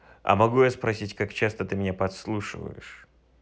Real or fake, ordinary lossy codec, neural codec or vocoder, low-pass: real; none; none; none